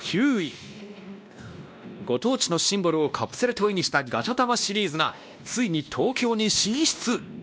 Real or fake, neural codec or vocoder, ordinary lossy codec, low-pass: fake; codec, 16 kHz, 1 kbps, X-Codec, WavLM features, trained on Multilingual LibriSpeech; none; none